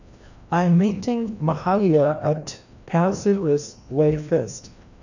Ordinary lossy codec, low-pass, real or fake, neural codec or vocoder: none; 7.2 kHz; fake; codec, 16 kHz, 1 kbps, FreqCodec, larger model